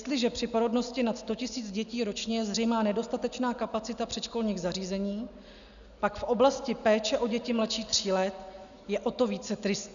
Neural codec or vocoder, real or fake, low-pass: none; real; 7.2 kHz